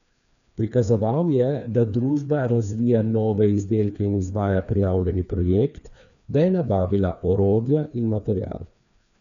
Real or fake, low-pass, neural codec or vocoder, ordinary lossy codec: fake; 7.2 kHz; codec, 16 kHz, 2 kbps, FreqCodec, larger model; none